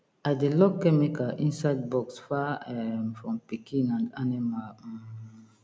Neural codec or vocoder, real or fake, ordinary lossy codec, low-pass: none; real; none; none